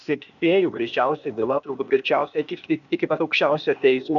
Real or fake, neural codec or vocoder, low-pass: fake; codec, 16 kHz, 0.8 kbps, ZipCodec; 7.2 kHz